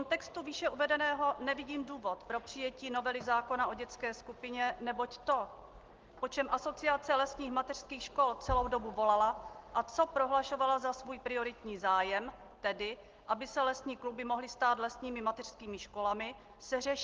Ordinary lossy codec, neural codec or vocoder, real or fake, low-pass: Opus, 16 kbps; none; real; 7.2 kHz